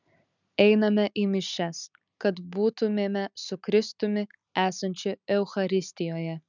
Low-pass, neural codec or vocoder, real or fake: 7.2 kHz; none; real